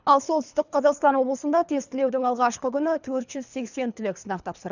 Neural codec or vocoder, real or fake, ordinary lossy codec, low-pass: codec, 24 kHz, 3 kbps, HILCodec; fake; none; 7.2 kHz